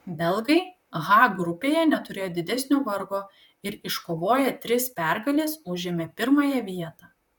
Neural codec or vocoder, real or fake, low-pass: vocoder, 44.1 kHz, 128 mel bands, Pupu-Vocoder; fake; 19.8 kHz